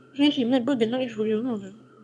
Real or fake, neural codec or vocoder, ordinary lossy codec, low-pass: fake; autoencoder, 22.05 kHz, a latent of 192 numbers a frame, VITS, trained on one speaker; none; none